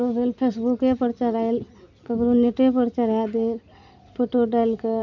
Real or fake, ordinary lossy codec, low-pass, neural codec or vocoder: real; none; 7.2 kHz; none